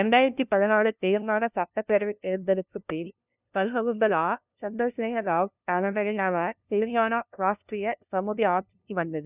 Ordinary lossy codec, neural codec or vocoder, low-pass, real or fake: none; codec, 16 kHz, 0.5 kbps, FunCodec, trained on LibriTTS, 25 frames a second; 3.6 kHz; fake